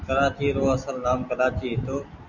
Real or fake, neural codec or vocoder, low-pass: real; none; 7.2 kHz